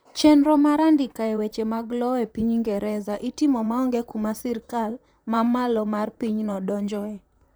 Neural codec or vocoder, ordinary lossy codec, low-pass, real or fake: vocoder, 44.1 kHz, 128 mel bands, Pupu-Vocoder; none; none; fake